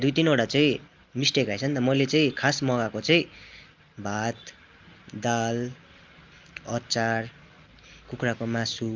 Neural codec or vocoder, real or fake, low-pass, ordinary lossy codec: none; real; 7.2 kHz; Opus, 24 kbps